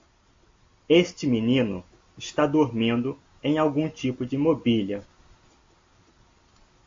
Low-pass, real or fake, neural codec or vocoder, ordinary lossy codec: 7.2 kHz; real; none; AAC, 48 kbps